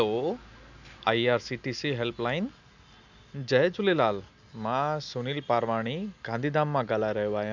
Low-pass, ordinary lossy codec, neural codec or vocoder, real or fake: 7.2 kHz; none; none; real